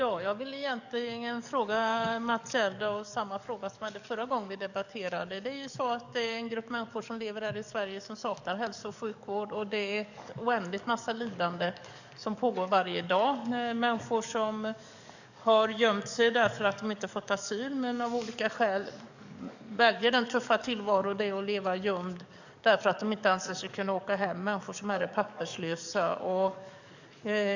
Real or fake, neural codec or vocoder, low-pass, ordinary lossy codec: fake; codec, 44.1 kHz, 7.8 kbps, DAC; 7.2 kHz; none